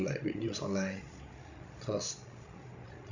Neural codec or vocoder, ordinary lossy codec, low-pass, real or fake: codec, 16 kHz, 16 kbps, FreqCodec, larger model; none; 7.2 kHz; fake